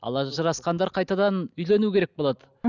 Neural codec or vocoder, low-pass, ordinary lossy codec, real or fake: none; 7.2 kHz; none; real